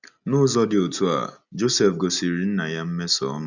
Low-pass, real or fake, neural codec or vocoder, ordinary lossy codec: 7.2 kHz; real; none; none